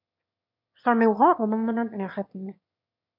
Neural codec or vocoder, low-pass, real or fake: autoencoder, 22.05 kHz, a latent of 192 numbers a frame, VITS, trained on one speaker; 5.4 kHz; fake